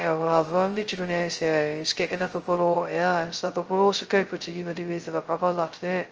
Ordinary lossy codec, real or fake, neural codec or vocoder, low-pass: Opus, 24 kbps; fake; codec, 16 kHz, 0.2 kbps, FocalCodec; 7.2 kHz